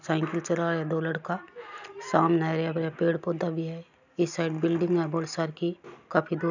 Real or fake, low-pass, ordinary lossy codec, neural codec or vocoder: real; 7.2 kHz; none; none